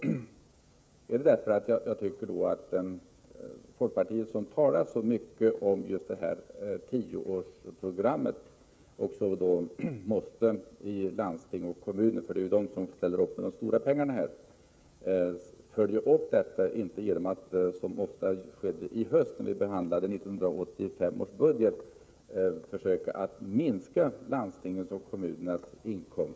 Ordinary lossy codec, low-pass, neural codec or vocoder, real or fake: none; none; codec, 16 kHz, 16 kbps, FreqCodec, smaller model; fake